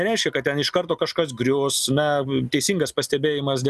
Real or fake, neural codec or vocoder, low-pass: real; none; 14.4 kHz